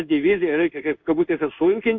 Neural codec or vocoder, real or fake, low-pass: codec, 16 kHz in and 24 kHz out, 1 kbps, XY-Tokenizer; fake; 7.2 kHz